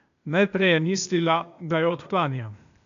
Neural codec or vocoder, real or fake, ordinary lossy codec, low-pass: codec, 16 kHz, 0.8 kbps, ZipCodec; fake; none; 7.2 kHz